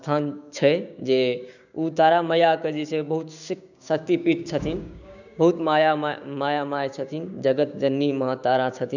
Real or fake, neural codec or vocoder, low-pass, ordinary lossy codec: fake; codec, 16 kHz, 6 kbps, DAC; 7.2 kHz; none